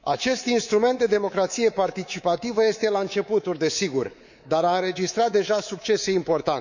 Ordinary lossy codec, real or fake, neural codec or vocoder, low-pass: none; fake; codec, 24 kHz, 3.1 kbps, DualCodec; 7.2 kHz